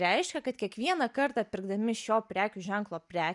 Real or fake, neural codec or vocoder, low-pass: real; none; 10.8 kHz